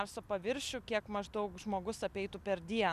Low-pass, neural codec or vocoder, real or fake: 14.4 kHz; none; real